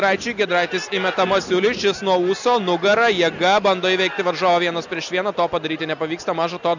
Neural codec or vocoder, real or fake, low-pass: none; real; 7.2 kHz